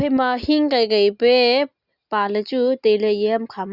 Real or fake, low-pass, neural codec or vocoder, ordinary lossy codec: real; 5.4 kHz; none; Opus, 64 kbps